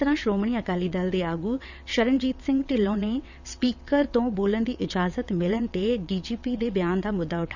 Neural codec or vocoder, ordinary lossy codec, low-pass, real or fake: vocoder, 22.05 kHz, 80 mel bands, WaveNeXt; none; 7.2 kHz; fake